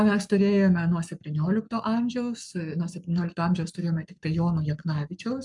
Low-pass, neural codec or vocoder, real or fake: 10.8 kHz; codec, 44.1 kHz, 7.8 kbps, Pupu-Codec; fake